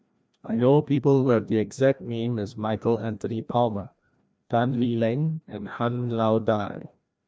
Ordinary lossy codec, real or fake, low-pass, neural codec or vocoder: none; fake; none; codec, 16 kHz, 1 kbps, FreqCodec, larger model